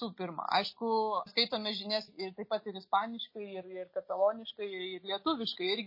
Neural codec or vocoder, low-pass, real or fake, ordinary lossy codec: none; 5.4 kHz; real; MP3, 24 kbps